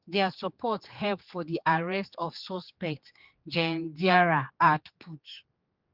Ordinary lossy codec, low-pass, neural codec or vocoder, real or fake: Opus, 32 kbps; 5.4 kHz; codec, 16 kHz, 4 kbps, X-Codec, HuBERT features, trained on general audio; fake